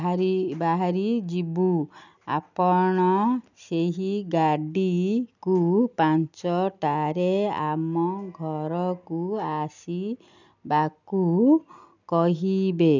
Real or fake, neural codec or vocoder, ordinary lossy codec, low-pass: real; none; none; 7.2 kHz